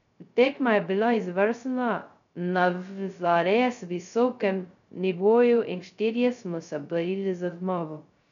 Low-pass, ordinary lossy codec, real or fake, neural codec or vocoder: 7.2 kHz; none; fake; codec, 16 kHz, 0.2 kbps, FocalCodec